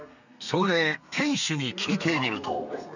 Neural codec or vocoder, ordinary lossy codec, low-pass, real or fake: codec, 32 kHz, 1.9 kbps, SNAC; none; 7.2 kHz; fake